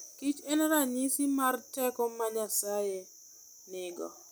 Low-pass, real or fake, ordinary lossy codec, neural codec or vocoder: none; real; none; none